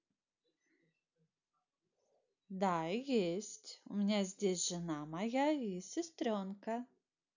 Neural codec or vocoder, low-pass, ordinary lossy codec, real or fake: none; 7.2 kHz; none; real